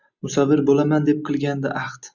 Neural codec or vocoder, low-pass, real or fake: none; 7.2 kHz; real